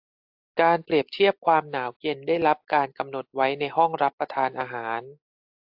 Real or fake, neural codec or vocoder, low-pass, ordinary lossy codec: real; none; 5.4 kHz; AAC, 48 kbps